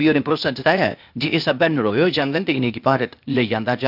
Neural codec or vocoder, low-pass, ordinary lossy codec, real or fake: codec, 16 kHz, 0.8 kbps, ZipCodec; 5.4 kHz; none; fake